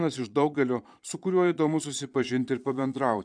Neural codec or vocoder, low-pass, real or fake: vocoder, 22.05 kHz, 80 mel bands, Vocos; 9.9 kHz; fake